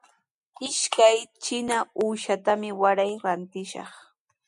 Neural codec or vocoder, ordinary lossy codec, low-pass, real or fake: none; MP3, 96 kbps; 10.8 kHz; real